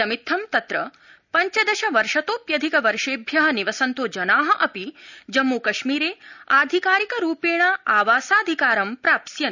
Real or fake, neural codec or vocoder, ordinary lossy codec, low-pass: real; none; none; 7.2 kHz